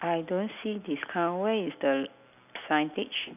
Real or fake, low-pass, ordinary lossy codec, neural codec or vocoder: real; 3.6 kHz; none; none